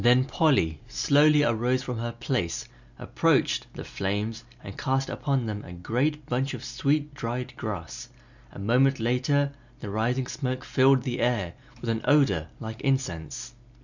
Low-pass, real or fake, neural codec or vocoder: 7.2 kHz; real; none